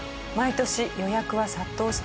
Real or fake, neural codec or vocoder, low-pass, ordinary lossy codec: real; none; none; none